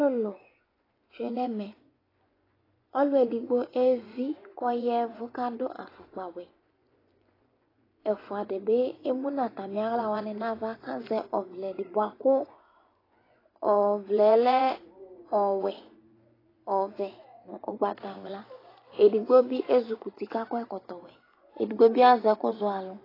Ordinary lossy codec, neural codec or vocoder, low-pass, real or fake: AAC, 24 kbps; vocoder, 24 kHz, 100 mel bands, Vocos; 5.4 kHz; fake